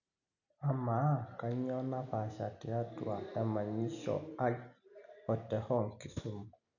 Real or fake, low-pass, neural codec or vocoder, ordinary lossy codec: real; 7.2 kHz; none; none